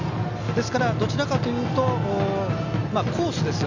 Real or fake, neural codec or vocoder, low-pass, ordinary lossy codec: real; none; 7.2 kHz; none